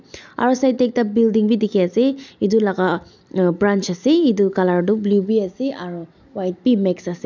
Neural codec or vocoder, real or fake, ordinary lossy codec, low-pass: none; real; none; 7.2 kHz